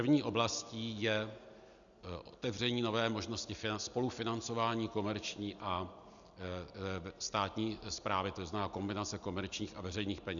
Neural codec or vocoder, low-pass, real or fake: none; 7.2 kHz; real